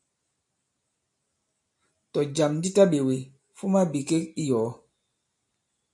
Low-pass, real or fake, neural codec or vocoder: 10.8 kHz; real; none